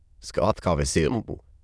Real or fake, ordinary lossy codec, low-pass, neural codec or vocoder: fake; none; none; autoencoder, 22.05 kHz, a latent of 192 numbers a frame, VITS, trained on many speakers